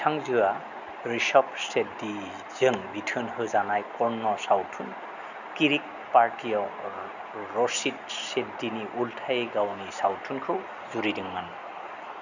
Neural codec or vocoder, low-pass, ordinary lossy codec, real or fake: none; 7.2 kHz; none; real